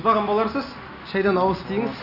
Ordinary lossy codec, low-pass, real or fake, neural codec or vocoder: none; 5.4 kHz; real; none